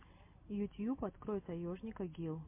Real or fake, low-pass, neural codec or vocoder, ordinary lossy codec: real; 3.6 kHz; none; MP3, 24 kbps